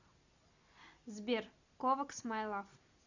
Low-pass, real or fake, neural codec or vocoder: 7.2 kHz; real; none